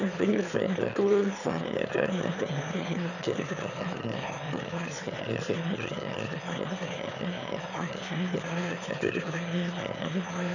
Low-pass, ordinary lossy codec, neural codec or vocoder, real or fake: 7.2 kHz; none; autoencoder, 22.05 kHz, a latent of 192 numbers a frame, VITS, trained on one speaker; fake